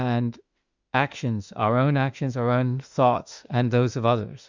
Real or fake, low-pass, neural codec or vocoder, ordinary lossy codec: fake; 7.2 kHz; autoencoder, 48 kHz, 32 numbers a frame, DAC-VAE, trained on Japanese speech; Opus, 64 kbps